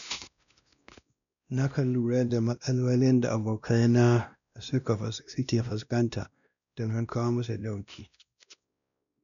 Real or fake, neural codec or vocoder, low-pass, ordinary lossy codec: fake; codec, 16 kHz, 1 kbps, X-Codec, WavLM features, trained on Multilingual LibriSpeech; 7.2 kHz; none